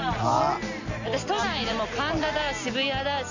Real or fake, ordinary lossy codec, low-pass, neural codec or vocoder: real; Opus, 64 kbps; 7.2 kHz; none